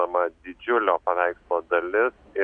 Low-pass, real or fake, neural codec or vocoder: 9.9 kHz; real; none